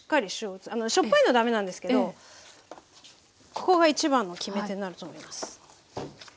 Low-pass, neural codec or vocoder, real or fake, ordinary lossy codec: none; none; real; none